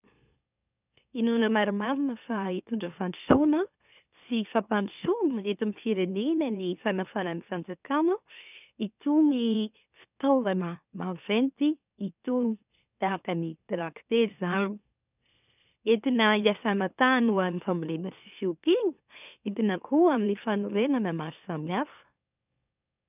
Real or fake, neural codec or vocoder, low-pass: fake; autoencoder, 44.1 kHz, a latent of 192 numbers a frame, MeloTTS; 3.6 kHz